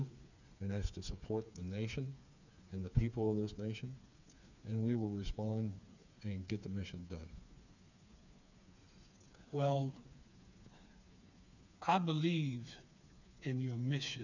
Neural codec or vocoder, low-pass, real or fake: codec, 16 kHz, 4 kbps, FreqCodec, smaller model; 7.2 kHz; fake